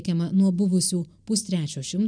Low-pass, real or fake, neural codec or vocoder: 9.9 kHz; real; none